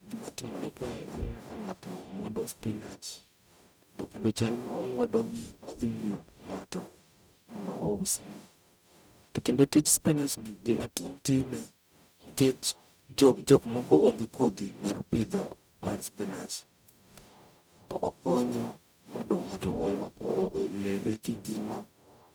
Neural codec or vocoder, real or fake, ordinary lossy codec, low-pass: codec, 44.1 kHz, 0.9 kbps, DAC; fake; none; none